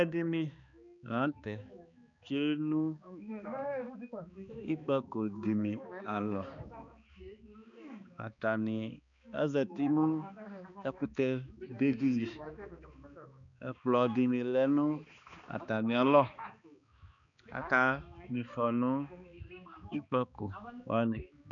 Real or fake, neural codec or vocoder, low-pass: fake; codec, 16 kHz, 2 kbps, X-Codec, HuBERT features, trained on balanced general audio; 7.2 kHz